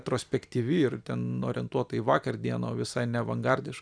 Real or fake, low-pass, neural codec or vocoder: fake; 9.9 kHz; vocoder, 44.1 kHz, 128 mel bands every 256 samples, BigVGAN v2